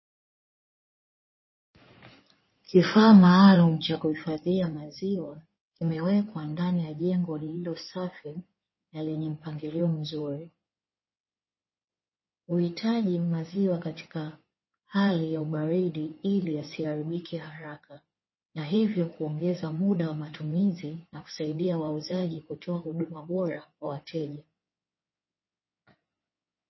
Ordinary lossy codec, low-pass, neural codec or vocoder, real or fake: MP3, 24 kbps; 7.2 kHz; codec, 16 kHz in and 24 kHz out, 2.2 kbps, FireRedTTS-2 codec; fake